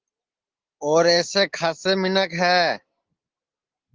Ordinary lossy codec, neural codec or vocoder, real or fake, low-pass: Opus, 16 kbps; none; real; 7.2 kHz